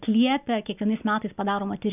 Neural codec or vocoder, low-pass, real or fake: none; 3.6 kHz; real